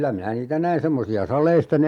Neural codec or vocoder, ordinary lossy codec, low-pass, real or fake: none; MP3, 96 kbps; 19.8 kHz; real